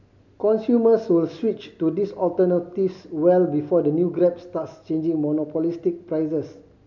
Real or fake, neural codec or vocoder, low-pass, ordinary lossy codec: real; none; 7.2 kHz; none